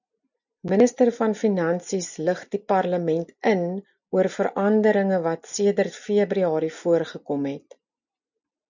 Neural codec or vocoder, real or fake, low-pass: none; real; 7.2 kHz